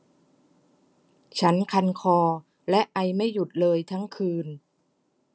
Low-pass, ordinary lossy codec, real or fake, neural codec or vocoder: none; none; real; none